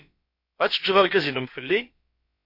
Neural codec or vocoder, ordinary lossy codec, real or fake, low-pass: codec, 16 kHz, about 1 kbps, DyCAST, with the encoder's durations; MP3, 32 kbps; fake; 5.4 kHz